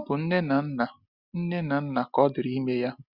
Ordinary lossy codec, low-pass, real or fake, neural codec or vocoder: Opus, 64 kbps; 5.4 kHz; real; none